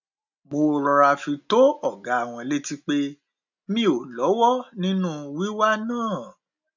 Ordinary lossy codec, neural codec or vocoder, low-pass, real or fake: none; none; 7.2 kHz; real